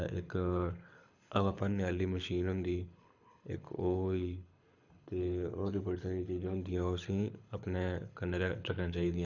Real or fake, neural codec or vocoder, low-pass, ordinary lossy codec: fake; codec, 24 kHz, 6 kbps, HILCodec; 7.2 kHz; none